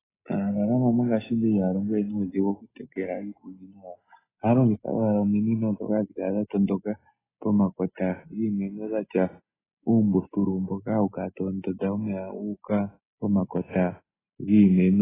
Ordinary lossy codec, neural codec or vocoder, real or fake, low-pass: AAC, 16 kbps; none; real; 3.6 kHz